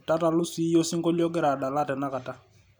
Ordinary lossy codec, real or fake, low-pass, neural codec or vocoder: none; real; none; none